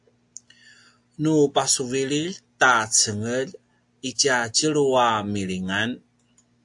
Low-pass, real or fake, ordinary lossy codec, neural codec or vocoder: 10.8 kHz; real; AAC, 64 kbps; none